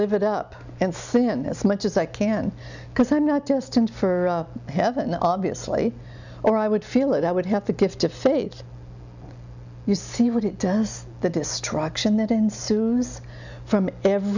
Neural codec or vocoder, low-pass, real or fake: none; 7.2 kHz; real